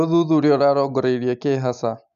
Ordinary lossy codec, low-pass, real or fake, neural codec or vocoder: MP3, 64 kbps; 7.2 kHz; real; none